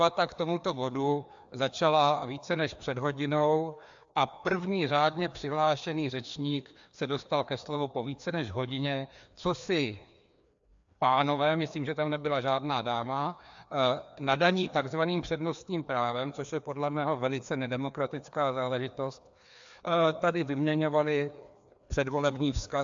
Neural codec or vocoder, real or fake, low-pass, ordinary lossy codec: codec, 16 kHz, 2 kbps, FreqCodec, larger model; fake; 7.2 kHz; AAC, 64 kbps